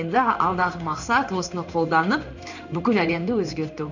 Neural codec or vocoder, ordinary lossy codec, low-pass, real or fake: codec, 16 kHz in and 24 kHz out, 1 kbps, XY-Tokenizer; none; 7.2 kHz; fake